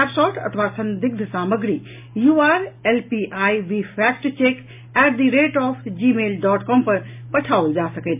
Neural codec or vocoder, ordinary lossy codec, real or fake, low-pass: none; MP3, 32 kbps; real; 3.6 kHz